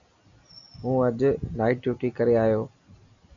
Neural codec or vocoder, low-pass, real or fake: none; 7.2 kHz; real